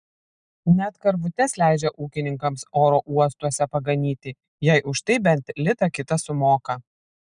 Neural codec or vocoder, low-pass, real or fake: none; 9.9 kHz; real